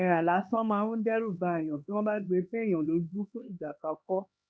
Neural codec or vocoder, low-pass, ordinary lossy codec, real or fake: codec, 16 kHz, 2 kbps, X-Codec, HuBERT features, trained on LibriSpeech; none; none; fake